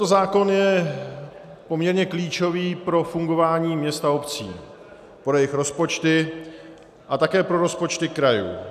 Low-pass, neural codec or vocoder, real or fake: 14.4 kHz; none; real